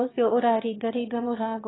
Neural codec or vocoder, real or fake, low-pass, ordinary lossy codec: autoencoder, 22.05 kHz, a latent of 192 numbers a frame, VITS, trained on one speaker; fake; 7.2 kHz; AAC, 16 kbps